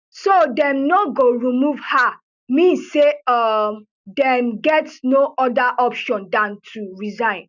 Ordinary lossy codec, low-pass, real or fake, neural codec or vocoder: none; 7.2 kHz; real; none